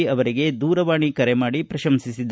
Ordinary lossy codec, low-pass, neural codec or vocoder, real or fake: none; none; none; real